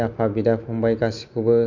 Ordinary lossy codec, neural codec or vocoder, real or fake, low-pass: none; none; real; 7.2 kHz